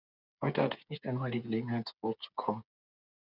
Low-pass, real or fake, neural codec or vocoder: 5.4 kHz; fake; codec, 16 kHz, 8 kbps, FreqCodec, smaller model